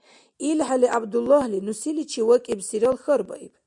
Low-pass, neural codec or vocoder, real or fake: 10.8 kHz; none; real